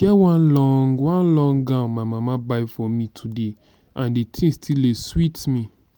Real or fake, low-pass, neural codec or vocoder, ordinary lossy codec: real; none; none; none